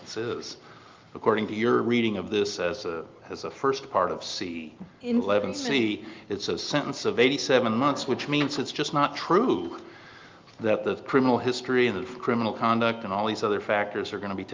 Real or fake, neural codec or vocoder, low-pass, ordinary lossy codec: real; none; 7.2 kHz; Opus, 32 kbps